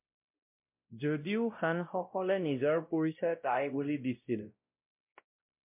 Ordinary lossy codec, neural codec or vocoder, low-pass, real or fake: MP3, 32 kbps; codec, 16 kHz, 0.5 kbps, X-Codec, WavLM features, trained on Multilingual LibriSpeech; 3.6 kHz; fake